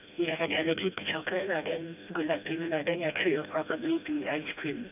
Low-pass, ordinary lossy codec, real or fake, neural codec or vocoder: 3.6 kHz; none; fake; codec, 16 kHz, 1 kbps, FreqCodec, smaller model